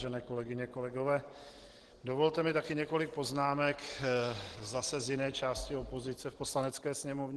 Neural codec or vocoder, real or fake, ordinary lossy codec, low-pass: none; real; Opus, 16 kbps; 10.8 kHz